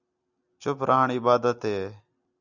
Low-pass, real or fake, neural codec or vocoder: 7.2 kHz; real; none